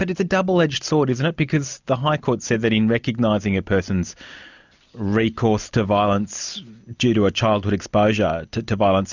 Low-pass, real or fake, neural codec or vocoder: 7.2 kHz; real; none